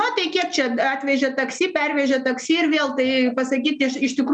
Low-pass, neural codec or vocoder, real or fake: 9.9 kHz; none; real